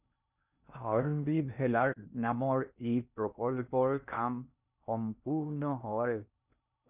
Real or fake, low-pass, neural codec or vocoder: fake; 3.6 kHz; codec, 16 kHz in and 24 kHz out, 0.6 kbps, FocalCodec, streaming, 2048 codes